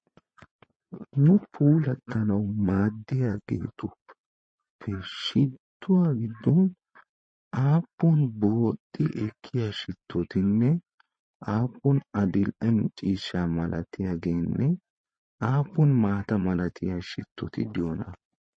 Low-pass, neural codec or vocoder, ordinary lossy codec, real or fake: 9.9 kHz; vocoder, 22.05 kHz, 80 mel bands, Vocos; MP3, 32 kbps; fake